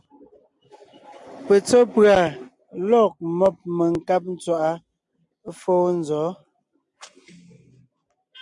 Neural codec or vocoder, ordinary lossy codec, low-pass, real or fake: none; MP3, 96 kbps; 10.8 kHz; real